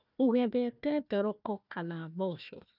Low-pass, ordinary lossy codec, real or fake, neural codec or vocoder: 5.4 kHz; none; fake; codec, 16 kHz, 1 kbps, FunCodec, trained on Chinese and English, 50 frames a second